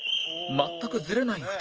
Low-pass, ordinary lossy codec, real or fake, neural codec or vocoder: 7.2 kHz; Opus, 24 kbps; fake; autoencoder, 48 kHz, 128 numbers a frame, DAC-VAE, trained on Japanese speech